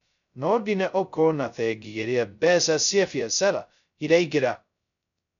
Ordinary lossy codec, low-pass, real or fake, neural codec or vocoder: none; 7.2 kHz; fake; codec, 16 kHz, 0.2 kbps, FocalCodec